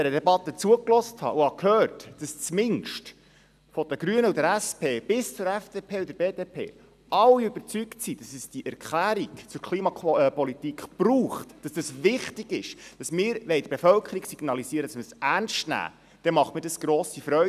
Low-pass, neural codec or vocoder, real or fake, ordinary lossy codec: 14.4 kHz; none; real; none